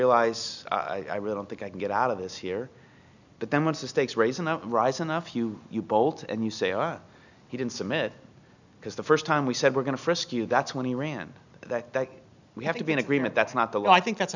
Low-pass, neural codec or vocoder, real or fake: 7.2 kHz; none; real